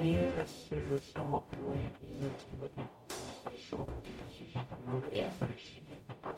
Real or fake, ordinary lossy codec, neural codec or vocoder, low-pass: fake; MP3, 64 kbps; codec, 44.1 kHz, 0.9 kbps, DAC; 19.8 kHz